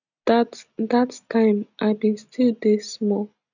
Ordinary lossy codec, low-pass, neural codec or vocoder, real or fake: none; 7.2 kHz; none; real